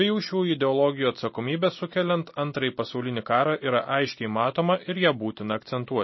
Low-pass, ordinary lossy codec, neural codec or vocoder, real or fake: 7.2 kHz; MP3, 24 kbps; none; real